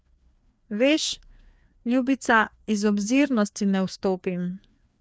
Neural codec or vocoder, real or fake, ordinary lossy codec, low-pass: codec, 16 kHz, 2 kbps, FreqCodec, larger model; fake; none; none